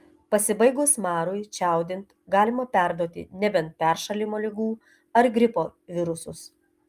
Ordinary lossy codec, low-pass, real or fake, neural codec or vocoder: Opus, 32 kbps; 14.4 kHz; real; none